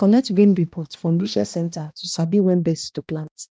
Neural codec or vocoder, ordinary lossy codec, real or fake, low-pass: codec, 16 kHz, 1 kbps, X-Codec, HuBERT features, trained on balanced general audio; none; fake; none